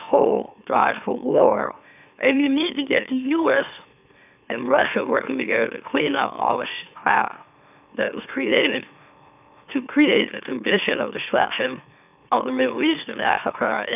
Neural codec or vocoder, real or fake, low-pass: autoencoder, 44.1 kHz, a latent of 192 numbers a frame, MeloTTS; fake; 3.6 kHz